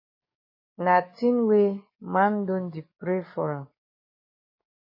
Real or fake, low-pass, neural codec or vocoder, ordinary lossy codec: fake; 5.4 kHz; codec, 44.1 kHz, 7.8 kbps, DAC; MP3, 24 kbps